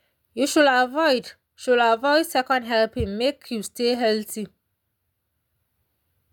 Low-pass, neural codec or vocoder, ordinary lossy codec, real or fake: 19.8 kHz; none; none; real